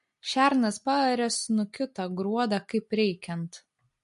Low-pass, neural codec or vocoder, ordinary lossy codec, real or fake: 10.8 kHz; none; MP3, 48 kbps; real